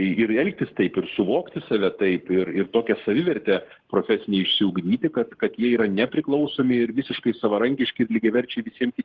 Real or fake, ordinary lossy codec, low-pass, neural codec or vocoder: fake; Opus, 16 kbps; 7.2 kHz; codec, 44.1 kHz, 7.8 kbps, Pupu-Codec